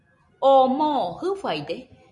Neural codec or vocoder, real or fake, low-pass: none; real; 10.8 kHz